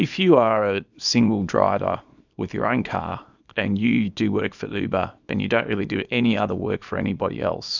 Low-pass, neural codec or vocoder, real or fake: 7.2 kHz; codec, 24 kHz, 0.9 kbps, WavTokenizer, small release; fake